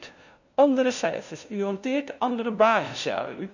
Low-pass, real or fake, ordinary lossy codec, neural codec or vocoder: 7.2 kHz; fake; none; codec, 16 kHz, 0.5 kbps, FunCodec, trained on LibriTTS, 25 frames a second